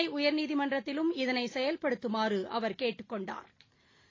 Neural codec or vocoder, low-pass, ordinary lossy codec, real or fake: none; 7.2 kHz; AAC, 32 kbps; real